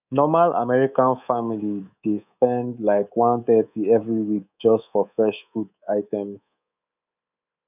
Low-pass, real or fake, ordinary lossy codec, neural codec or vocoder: 3.6 kHz; fake; none; codec, 24 kHz, 3.1 kbps, DualCodec